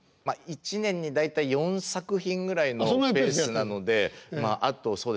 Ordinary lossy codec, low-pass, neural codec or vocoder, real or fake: none; none; none; real